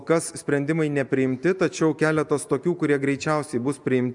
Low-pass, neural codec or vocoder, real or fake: 10.8 kHz; none; real